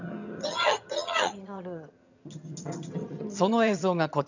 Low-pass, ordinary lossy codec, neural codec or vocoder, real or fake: 7.2 kHz; none; vocoder, 22.05 kHz, 80 mel bands, HiFi-GAN; fake